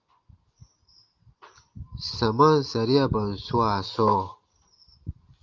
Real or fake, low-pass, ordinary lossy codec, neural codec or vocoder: real; 7.2 kHz; Opus, 24 kbps; none